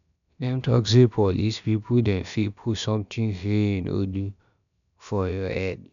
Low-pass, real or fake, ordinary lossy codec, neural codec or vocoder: 7.2 kHz; fake; none; codec, 16 kHz, about 1 kbps, DyCAST, with the encoder's durations